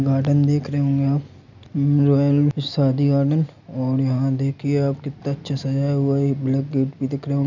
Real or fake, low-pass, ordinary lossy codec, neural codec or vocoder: real; 7.2 kHz; none; none